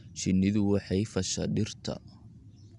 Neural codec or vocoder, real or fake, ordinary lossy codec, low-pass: none; real; none; 10.8 kHz